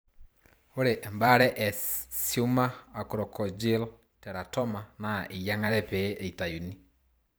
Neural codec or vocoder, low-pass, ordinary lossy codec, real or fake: vocoder, 44.1 kHz, 128 mel bands every 512 samples, BigVGAN v2; none; none; fake